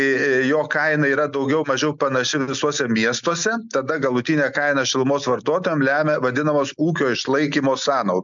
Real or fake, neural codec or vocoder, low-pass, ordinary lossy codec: real; none; 7.2 kHz; MP3, 64 kbps